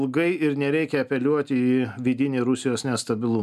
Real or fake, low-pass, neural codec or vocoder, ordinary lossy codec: real; 14.4 kHz; none; MP3, 96 kbps